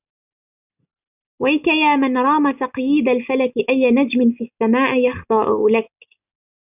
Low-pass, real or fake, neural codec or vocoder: 3.6 kHz; real; none